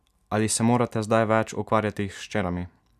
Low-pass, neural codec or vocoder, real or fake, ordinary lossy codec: 14.4 kHz; none; real; none